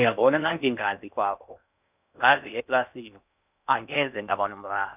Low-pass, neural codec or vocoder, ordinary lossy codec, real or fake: 3.6 kHz; codec, 16 kHz in and 24 kHz out, 0.6 kbps, FocalCodec, streaming, 4096 codes; none; fake